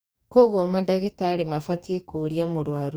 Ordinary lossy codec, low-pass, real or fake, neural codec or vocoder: none; none; fake; codec, 44.1 kHz, 2.6 kbps, DAC